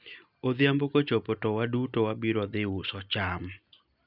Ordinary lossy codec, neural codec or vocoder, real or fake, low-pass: none; none; real; 5.4 kHz